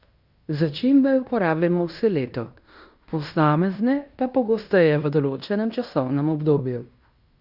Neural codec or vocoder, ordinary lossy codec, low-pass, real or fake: codec, 16 kHz in and 24 kHz out, 0.9 kbps, LongCat-Audio-Codec, fine tuned four codebook decoder; Opus, 64 kbps; 5.4 kHz; fake